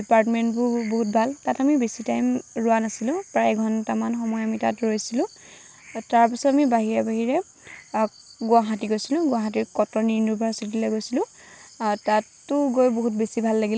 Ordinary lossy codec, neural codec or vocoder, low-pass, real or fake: none; none; none; real